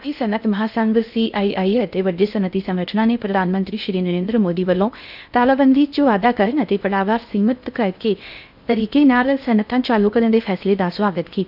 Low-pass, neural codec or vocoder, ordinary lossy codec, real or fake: 5.4 kHz; codec, 16 kHz in and 24 kHz out, 0.6 kbps, FocalCodec, streaming, 2048 codes; none; fake